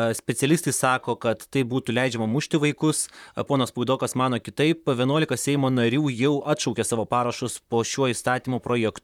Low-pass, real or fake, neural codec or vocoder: 19.8 kHz; fake; vocoder, 44.1 kHz, 128 mel bands, Pupu-Vocoder